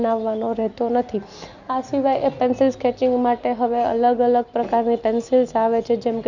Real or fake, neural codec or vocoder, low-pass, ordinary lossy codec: real; none; 7.2 kHz; none